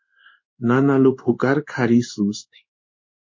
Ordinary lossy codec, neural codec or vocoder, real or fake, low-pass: MP3, 32 kbps; codec, 16 kHz in and 24 kHz out, 1 kbps, XY-Tokenizer; fake; 7.2 kHz